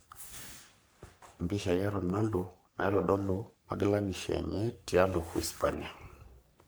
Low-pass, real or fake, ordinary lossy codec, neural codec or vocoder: none; fake; none; codec, 44.1 kHz, 3.4 kbps, Pupu-Codec